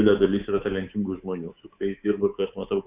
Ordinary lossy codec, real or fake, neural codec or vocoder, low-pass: Opus, 64 kbps; fake; codec, 24 kHz, 3.1 kbps, DualCodec; 3.6 kHz